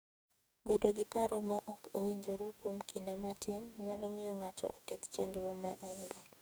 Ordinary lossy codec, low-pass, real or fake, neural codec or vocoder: none; none; fake; codec, 44.1 kHz, 2.6 kbps, DAC